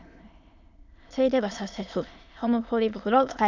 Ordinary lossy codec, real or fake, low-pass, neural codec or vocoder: none; fake; 7.2 kHz; autoencoder, 22.05 kHz, a latent of 192 numbers a frame, VITS, trained on many speakers